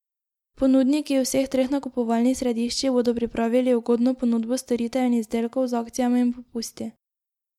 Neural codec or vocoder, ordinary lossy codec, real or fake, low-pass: none; MP3, 96 kbps; real; 19.8 kHz